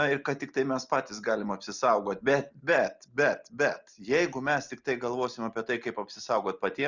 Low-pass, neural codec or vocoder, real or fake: 7.2 kHz; none; real